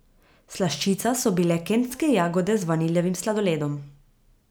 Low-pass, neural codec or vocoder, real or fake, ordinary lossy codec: none; none; real; none